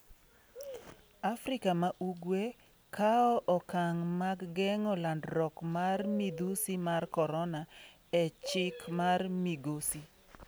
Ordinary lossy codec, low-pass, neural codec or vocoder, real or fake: none; none; none; real